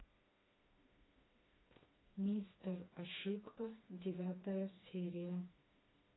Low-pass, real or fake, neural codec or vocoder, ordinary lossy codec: 7.2 kHz; fake; codec, 16 kHz, 2 kbps, FreqCodec, smaller model; AAC, 16 kbps